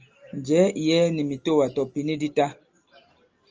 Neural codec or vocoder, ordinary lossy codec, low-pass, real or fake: none; Opus, 24 kbps; 7.2 kHz; real